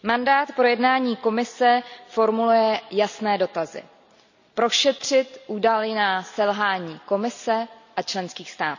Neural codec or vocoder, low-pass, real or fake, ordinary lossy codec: none; 7.2 kHz; real; none